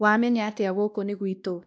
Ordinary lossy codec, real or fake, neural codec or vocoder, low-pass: none; fake; codec, 16 kHz, 1 kbps, X-Codec, WavLM features, trained on Multilingual LibriSpeech; none